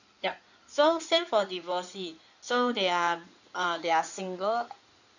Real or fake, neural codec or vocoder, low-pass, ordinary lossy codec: fake; codec, 16 kHz in and 24 kHz out, 2.2 kbps, FireRedTTS-2 codec; 7.2 kHz; none